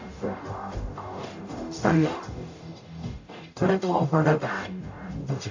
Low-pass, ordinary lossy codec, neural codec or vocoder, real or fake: 7.2 kHz; AAC, 48 kbps; codec, 44.1 kHz, 0.9 kbps, DAC; fake